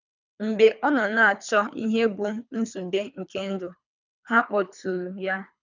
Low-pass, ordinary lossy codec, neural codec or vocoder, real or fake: 7.2 kHz; none; codec, 24 kHz, 3 kbps, HILCodec; fake